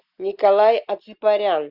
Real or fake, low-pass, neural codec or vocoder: real; 5.4 kHz; none